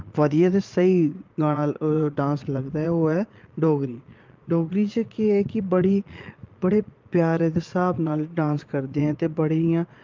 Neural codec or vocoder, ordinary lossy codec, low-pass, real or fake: vocoder, 22.05 kHz, 80 mel bands, Vocos; Opus, 24 kbps; 7.2 kHz; fake